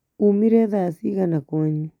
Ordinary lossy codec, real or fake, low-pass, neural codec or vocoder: none; real; 19.8 kHz; none